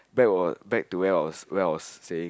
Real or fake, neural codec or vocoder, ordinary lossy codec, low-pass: real; none; none; none